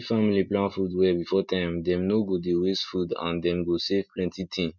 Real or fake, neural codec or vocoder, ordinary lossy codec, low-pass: real; none; none; 7.2 kHz